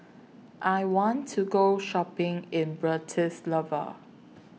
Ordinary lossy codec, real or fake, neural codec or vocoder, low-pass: none; real; none; none